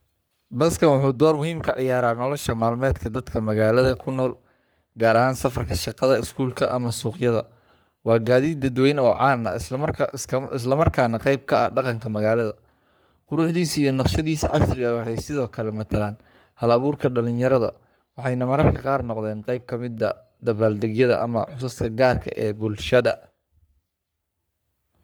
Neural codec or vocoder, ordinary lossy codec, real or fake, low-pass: codec, 44.1 kHz, 3.4 kbps, Pupu-Codec; none; fake; none